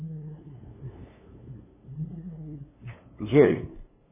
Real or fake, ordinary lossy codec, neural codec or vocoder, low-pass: fake; MP3, 16 kbps; codec, 16 kHz, 2 kbps, FunCodec, trained on LibriTTS, 25 frames a second; 3.6 kHz